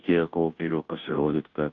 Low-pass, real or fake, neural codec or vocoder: 7.2 kHz; fake; codec, 16 kHz, 0.5 kbps, FunCodec, trained on Chinese and English, 25 frames a second